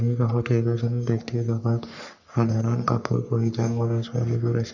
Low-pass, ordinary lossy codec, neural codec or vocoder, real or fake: 7.2 kHz; none; codec, 44.1 kHz, 3.4 kbps, Pupu-Codec; fake